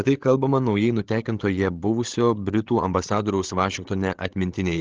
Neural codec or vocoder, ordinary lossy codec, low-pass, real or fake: codec, 16 kHz, 16 kbps, FreqCodec, larger model; Opus, 16 kbps; 7.2 kHz; fake